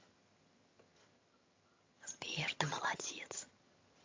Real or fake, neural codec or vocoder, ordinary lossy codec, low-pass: fake; vocoder, 22.05 kHz, 80 mel bands, HiFi-GAN; MP3, 48 kbps; 7.2 kHz